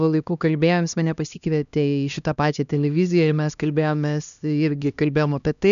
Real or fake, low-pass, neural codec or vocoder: fake; 7.2 kHz; codec, 16 kHz, 1 kbps, X-Codec, HuBERT features, trained on LibriSpeech